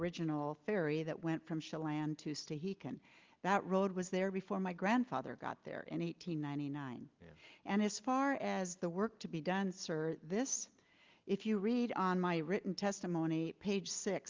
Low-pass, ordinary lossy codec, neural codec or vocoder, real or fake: 7.2 kHz; Opus, 24 kbps; none; real